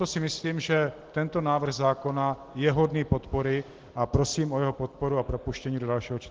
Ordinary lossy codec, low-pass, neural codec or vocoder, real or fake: Opus, 16 kbps; 7.2 kHz; none; real